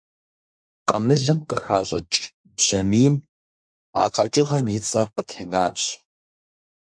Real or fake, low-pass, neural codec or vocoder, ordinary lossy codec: fake; 9.9 kHz; codec, 24 kHz, 1 kbps, SNAC; MP3, 64 kbps